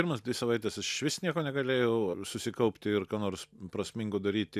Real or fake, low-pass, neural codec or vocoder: real; 14.4 kHz; none